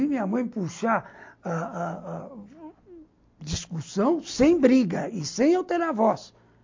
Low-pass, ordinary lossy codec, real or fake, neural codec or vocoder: 7.2 kHz; MP3, 48 kbps; real; none